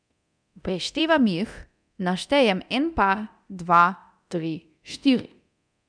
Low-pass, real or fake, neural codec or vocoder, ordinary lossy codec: 9.9 kHz; fake; codec, 24 kHz, 0.9 kbps, DualCodec; none